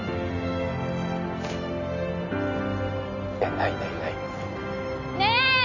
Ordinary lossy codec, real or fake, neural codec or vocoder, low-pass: none; real; none; 7.2 kHz